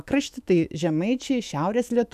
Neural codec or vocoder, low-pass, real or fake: autoencoder, 48 kHz, 128 numbers a frame, DAC-VAE, trained on Japanese speech; 14.4 kHz; fake